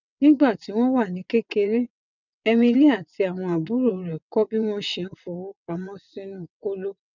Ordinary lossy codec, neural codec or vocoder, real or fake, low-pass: none; vocoder, 22.05 kHz, 80 mel bands, WaveNeXt; fake; 7.2 kHz